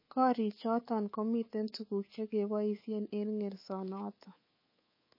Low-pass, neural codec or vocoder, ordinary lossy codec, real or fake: 5.4 kHz; codec, 24 kHz, 3.1 kbps, DualCodec; MP3, 24 kbps; fake